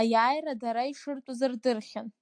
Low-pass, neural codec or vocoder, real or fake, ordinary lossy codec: 9.9 kHz; none; real; AAC, 64 kbps